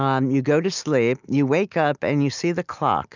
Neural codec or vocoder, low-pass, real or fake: none; 7.2 kHz; real